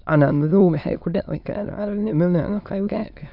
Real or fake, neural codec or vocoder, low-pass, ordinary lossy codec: fake; autoencoder, 22.05 kHz, a latent of 192 numbers a frame, VITS, trained on many speakers; 5.4 kHz; none